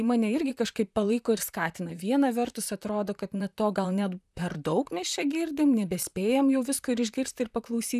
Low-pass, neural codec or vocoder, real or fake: 14.4 kHz; vocoder, 44.1 kHz, 128 mel bands, Pupu-Vocoder; fake